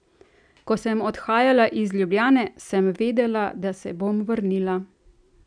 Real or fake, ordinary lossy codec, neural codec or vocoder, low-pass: real; none; none; 9.9 kHz